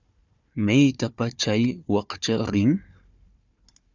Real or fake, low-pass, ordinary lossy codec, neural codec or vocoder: fake; 7.2 kHz; Opus, 64 kbps; codec, 16 kHz, 4 kbps, FunCodec, trained on Chinese and English, 50 frames a second